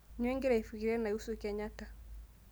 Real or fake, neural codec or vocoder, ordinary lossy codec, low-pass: real; none; none; none